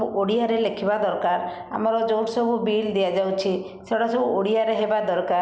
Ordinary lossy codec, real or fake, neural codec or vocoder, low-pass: none; real; none; none